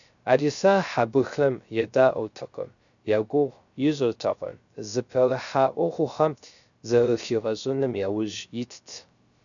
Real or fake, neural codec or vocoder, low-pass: fake; codec, 16 kHz, 0.3 kbps, FocalCodec; 7.2 kHz